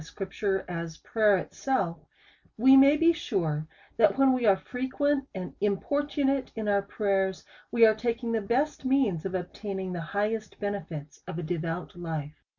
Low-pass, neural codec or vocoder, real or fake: 7.2 kHz; none; real